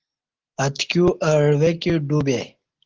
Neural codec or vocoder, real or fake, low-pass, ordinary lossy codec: none; real; 7.2 kHz; Opus, 16 kbps